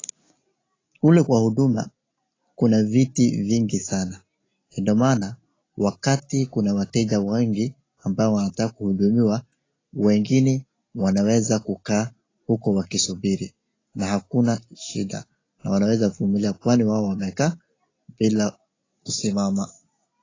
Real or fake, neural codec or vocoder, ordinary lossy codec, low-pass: real; none; AAC, 32 kbps; 7.2 kHz